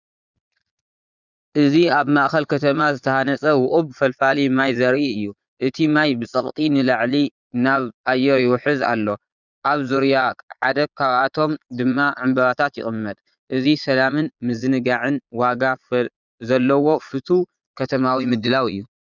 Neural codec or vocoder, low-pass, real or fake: vocoder, 22.05 kHz, 80 mel bands, Vocos; 7.2 kHz; fake